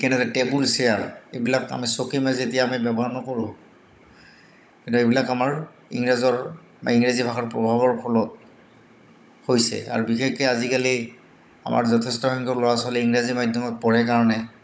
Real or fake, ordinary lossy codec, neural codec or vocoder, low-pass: fake; none; codec, 16 kHz, 16 kbps, FunCodec, trained on Chinese and English, 50 frames a second; none